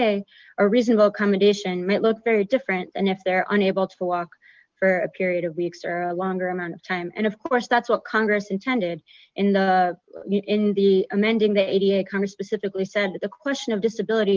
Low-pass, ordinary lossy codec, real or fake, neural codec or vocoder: 7.2 kHz; Opus, 32 kbps; real; none